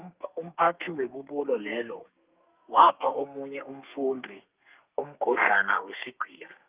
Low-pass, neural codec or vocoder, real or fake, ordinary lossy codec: 3.6 kHz; codec, 32 kHz, 1.9 kbps, SNAC; fake; Opus, 24 kbps